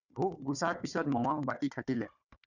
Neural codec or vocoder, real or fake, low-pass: codec, 16 kHz, 4 kbps, FunCodec, trained on Chinese and English, 50 frames a second; fake; 7.2 kHz